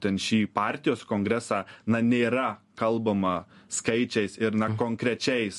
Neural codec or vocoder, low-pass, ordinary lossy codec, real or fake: none; 14.4 kHz; MP3, 48 kbps; real